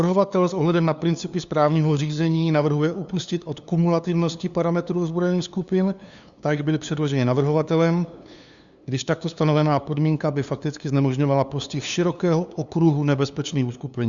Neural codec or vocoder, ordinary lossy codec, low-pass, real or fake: codec, 16 kHz, 2 kbps, FunCodec, trained on LibriTTS, 25 frames a second; Opus, 64 kbps; 7.2 kHz; fake